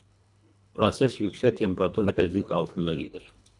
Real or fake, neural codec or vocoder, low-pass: fake; codec, 24 kHz, 1.5 kbps, HILCodec; 10.8 kHz